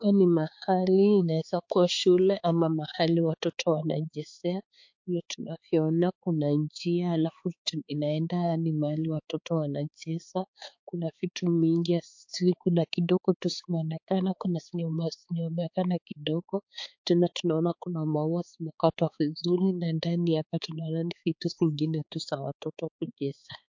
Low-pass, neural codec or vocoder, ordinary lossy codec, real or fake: 7.2 kHz; codec, 16 kHz, 4 kbps, X-Codec, HuBERT features, trained on balanced general audio; MP3, 48 kbps; fake